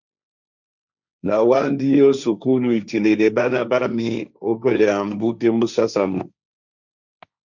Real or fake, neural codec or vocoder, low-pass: fake; codec, 16 kHz, 1.1 kbps, Voila-Tokenizer; 7.2 kHz